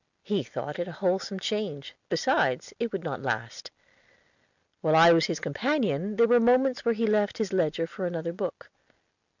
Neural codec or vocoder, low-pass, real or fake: none; 7.2 kHz; real